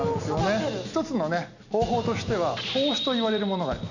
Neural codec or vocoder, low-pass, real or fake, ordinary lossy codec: none; 7.2 kHz; real; none